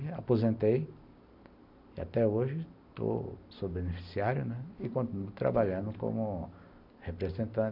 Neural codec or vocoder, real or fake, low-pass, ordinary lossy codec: none; real; 5.4 kHz; none